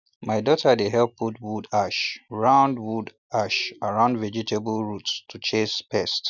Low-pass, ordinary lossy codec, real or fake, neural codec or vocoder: 7.2 kHz; none; real; none